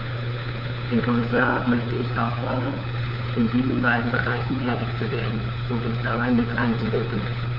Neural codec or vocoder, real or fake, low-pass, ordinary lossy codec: codec, 16 kHz, 4 kbps, FunCodec, trained on LibriTTS, 50 frames a second; fake; 5.4 kHz; none